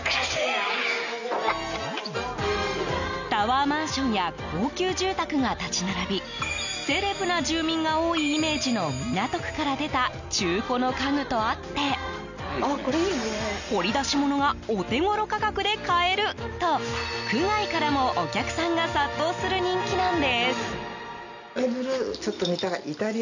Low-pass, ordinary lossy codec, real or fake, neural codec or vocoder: 7.2 kHz; none; real; none